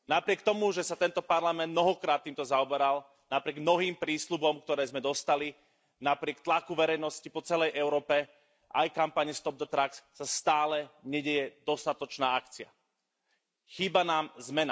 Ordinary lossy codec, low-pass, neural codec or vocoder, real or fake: none; none; none; real